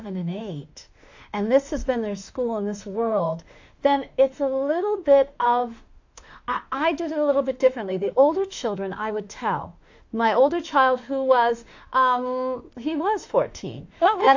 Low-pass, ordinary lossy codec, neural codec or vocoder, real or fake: 7.2 kHz; AAC, 48 kbps; autoencoder, 48 kHz, 32 numbers a frame, DAC-VAE, trained on Japanese speech; fake